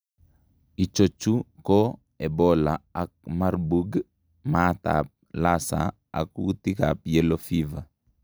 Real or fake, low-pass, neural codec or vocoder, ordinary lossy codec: real; none; none; none